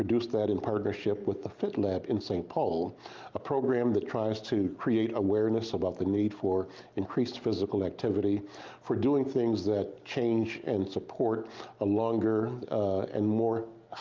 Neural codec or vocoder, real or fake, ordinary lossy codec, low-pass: none; real; Opus, 32 kbps; 7.2 kHz